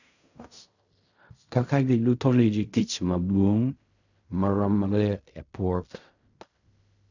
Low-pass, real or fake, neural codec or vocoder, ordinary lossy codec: 7.2 kHz; fake; codec, 16 kHz in and 24 kHz out, 0.4 kbps, LongCat-Audio-Codec, fine tuned four codebook decoder; none